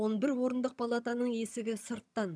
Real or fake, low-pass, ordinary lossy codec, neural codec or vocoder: fake; none; none; vocoder, 22.05 kHz, 80 mel bands, HiFi-GAN